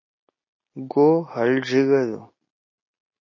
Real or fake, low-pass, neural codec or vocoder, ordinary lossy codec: real; 7.2 kHz; none; MP3, 32 kbps